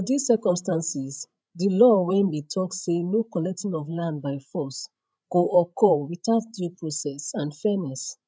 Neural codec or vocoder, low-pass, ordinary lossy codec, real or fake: codec, 16 kHz, 16 kbps, FreqCodec, larger model; none; none; fake